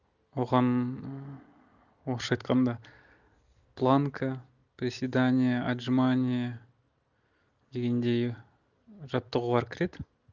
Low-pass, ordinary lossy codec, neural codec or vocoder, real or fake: 7.2 kHz; none; none; real